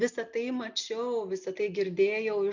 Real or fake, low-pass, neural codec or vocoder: real; 7.2 kHz; none